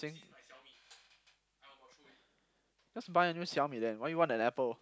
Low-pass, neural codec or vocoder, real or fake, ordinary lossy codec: none; none; real; none